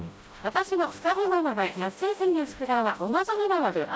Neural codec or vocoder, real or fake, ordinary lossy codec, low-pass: codec, 16 kHz, 0.5 kbps, FreqCodec, smaller model; fake; none; none